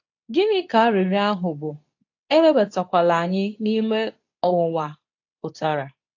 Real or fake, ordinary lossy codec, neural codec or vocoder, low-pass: fake; AAC, 48 kbps; codec, 24 kHz, 0.9 kbps, WavTokenizer, medium speech release version 2; 7.2 kHz